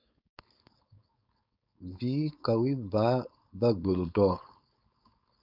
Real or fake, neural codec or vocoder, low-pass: fake; codec, 16 kHz, 4.8 kbps, FACodec; 5.4 kHz